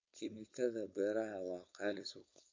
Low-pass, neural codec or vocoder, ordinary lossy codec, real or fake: 7.2 kHz; codec, 16 kHz, 4.8 kbps, FACodec; MP3, 48 kbps; fake